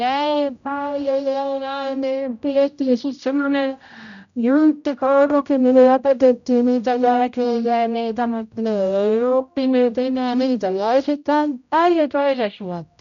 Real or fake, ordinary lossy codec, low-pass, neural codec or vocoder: fake; none; 7.2 kHz; codec, 16 kHz, 0.5 kbps, X-Codec, HuBERT features, trained on general audio